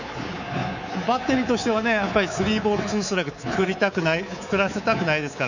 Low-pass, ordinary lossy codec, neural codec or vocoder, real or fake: 7.2 kHz; none; codec, 24 kHz, 3.1 kbps, DualCodec; fake